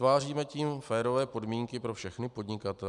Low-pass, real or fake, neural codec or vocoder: 10.8 kHz; real; none